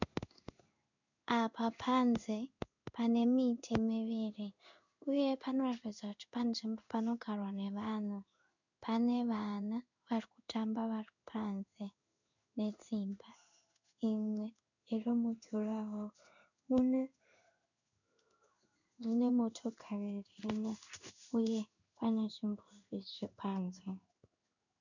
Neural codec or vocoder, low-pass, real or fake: codec, 16 kHz in and 24 kHz out, 1 kbps, XY-Tokenizer; 7.2 kHz; fake